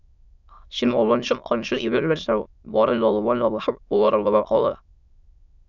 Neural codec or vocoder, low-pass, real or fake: autoencoder, 22.05 kHz, a latent of 192 numbers a frame, VITS, trained on many speakers; 7.2 kHz; fake